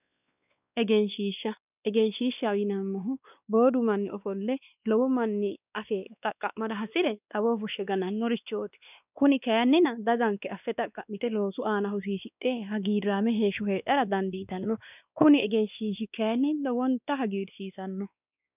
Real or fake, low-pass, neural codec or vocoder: fake; 3.6 kHz; codec, 16 kHz, 2 kbps, X-Codec, WavLM features, trained on Multilingual LibriSpeech